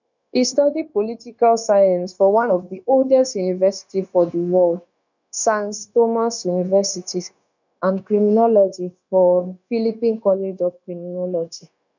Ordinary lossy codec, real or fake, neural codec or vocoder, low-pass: none; fake; codec, 16 kHz, 0.9 kbps, LongCat-Audio-Codec; 7.2 kHz